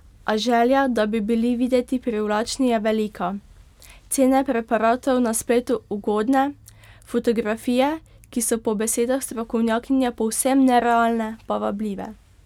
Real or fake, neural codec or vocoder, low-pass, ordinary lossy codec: real; none; 19.8 kHz; none